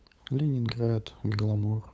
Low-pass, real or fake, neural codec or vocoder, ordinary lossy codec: none; fake; codec, 16 kHz, 8 kbps, FunCodec, trained on LibriTTS, 25 frames a second; none